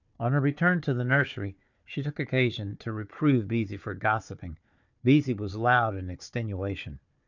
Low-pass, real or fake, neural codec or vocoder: 7.2 kHz; fake; codec, 16 kHz, 4 kbps, FunCodec, trained on Chinese and English, 50 frames a second